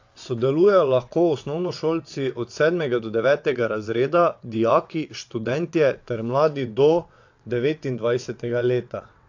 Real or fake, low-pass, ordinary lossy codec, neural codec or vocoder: fake; 7.2 kHz; AAC, 48 kbps; vocoder, 22.05 kHz, 80 mel bands, WaveNeXt